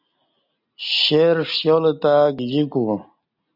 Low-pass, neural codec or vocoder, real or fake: 5.4 kHz; none; real